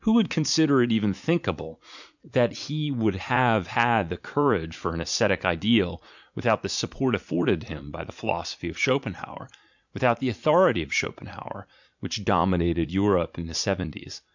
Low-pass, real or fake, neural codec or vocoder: 7.2 kHz; fake; vocoder, 44.1 kHz, 80 mel bands, Vocos